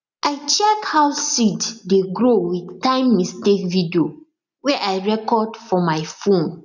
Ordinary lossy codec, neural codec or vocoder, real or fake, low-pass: none; none; real; 7.2 kHz